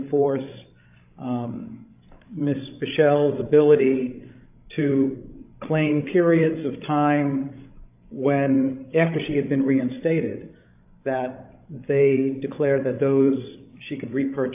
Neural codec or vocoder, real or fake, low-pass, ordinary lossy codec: codec, 16 kHz, 16 kbps, FreqCodec, larger model; fake; 3.6 kHz; AAC, 32 kbps